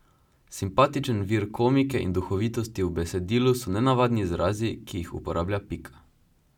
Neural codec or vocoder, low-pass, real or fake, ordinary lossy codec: none; 19.8 kHz; real; none